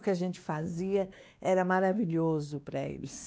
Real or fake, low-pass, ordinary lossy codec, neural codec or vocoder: fake; none; none; codec, 16 kHz, 2 kbps, X-Codec, WavLM features, trained on Multilingual LibriSpeech